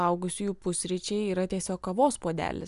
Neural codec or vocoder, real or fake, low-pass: none; real; 10.8 kHz